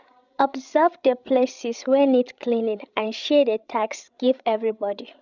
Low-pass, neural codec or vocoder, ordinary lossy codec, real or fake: 7.2 kHz; codec, 16 kHz, 16 kbps, FreqCodec, larger model; none; fake